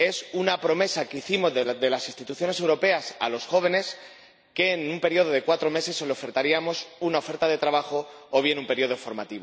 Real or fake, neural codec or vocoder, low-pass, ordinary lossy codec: real; none; none; none